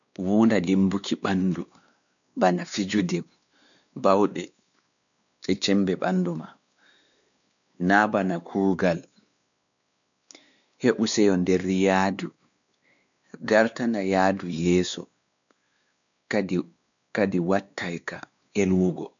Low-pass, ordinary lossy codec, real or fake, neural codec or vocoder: 7.2 kHz; none; fake; codec, 16 kHz, 2 kbps, X-Codec, WavLM features, trained on Multilingual LibriSpeech